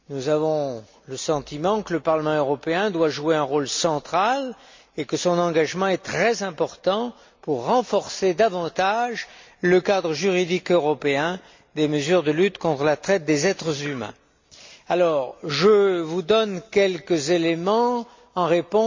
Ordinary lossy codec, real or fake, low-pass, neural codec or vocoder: MP3, 64 kbps; real; 7.2 kHz; none